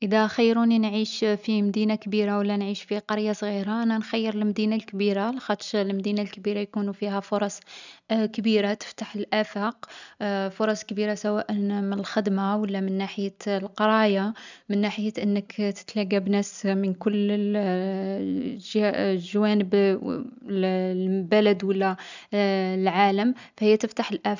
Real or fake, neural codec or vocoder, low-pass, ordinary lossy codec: real; none; 7.2 kHz; none